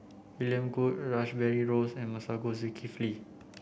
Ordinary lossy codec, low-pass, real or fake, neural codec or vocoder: none; none; real; none